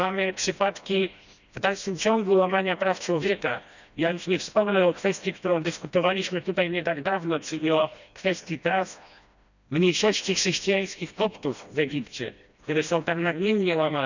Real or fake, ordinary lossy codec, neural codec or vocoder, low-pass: fake; none; codec, 16 kHz, 1 kbps, FreqCodec, smaller model; 7.2 kHz